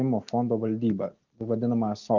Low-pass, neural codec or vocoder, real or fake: 7.2 kHz; none; real